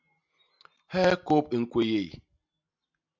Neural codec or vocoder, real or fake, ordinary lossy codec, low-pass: none; real; MP3, 64 kbps; 7.2 kHz